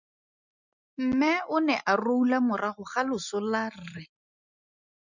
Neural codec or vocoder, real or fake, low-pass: none; real; 7.2 kHz